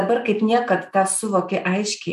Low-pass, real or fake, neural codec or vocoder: 14.4 kHz; real; none